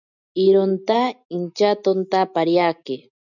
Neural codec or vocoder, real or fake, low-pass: none; real; 7.2 kHz